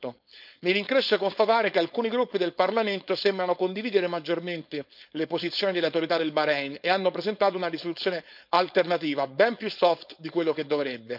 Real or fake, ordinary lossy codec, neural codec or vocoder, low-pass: fake; none; codec, 16 kHz, 4.8 kbps, FACodec; 5.4 kHz